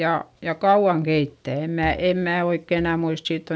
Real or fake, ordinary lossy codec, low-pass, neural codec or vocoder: real; none; none; none